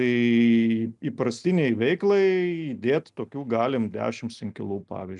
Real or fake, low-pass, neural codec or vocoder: real; 10.8 kHz; none